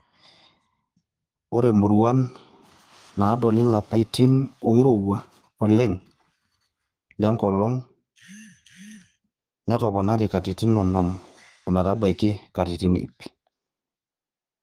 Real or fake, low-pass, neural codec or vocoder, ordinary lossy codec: fake; 14.4 kHz; codec, 32 kHz, 1.9 kbps, SNAC; Opus, 32 kbps